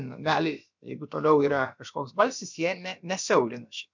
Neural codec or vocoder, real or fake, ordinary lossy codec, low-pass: codec, 16 kHz, about 1 kbps, DyCAST, with the encoder's durations; fake; MP3, 64 kbps; 7.2 kHz